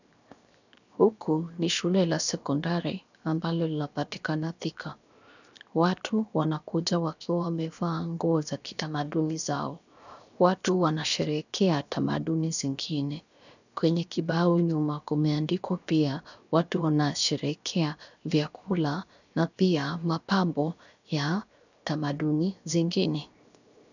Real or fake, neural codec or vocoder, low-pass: fake; codec, 16 kHz, 0.7 kbps, FocalCodec; 7.2 kHz